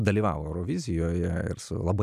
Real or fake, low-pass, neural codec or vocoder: real; 14.4 kHz; none